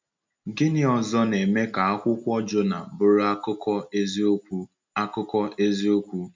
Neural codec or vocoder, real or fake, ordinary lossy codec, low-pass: none; real; MP3, 64 kbps; 7.2 kHz